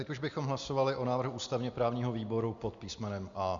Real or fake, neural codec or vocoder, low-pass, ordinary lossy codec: real; none; 7.2 kHz; Opus, 64 kbps